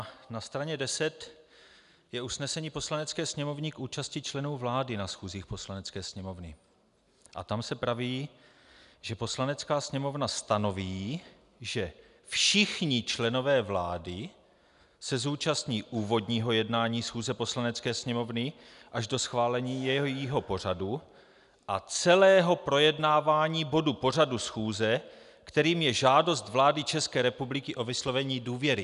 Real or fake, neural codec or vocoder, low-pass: real; none; 10.8 kHz